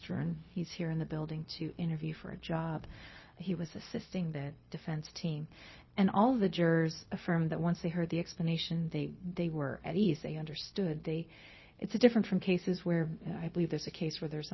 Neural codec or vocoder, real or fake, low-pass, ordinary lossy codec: codec, 16 kHz, 0.4 kbps, LongCat-Audio-Codec; fake; 7.2 kHz; MP3, 24 kbps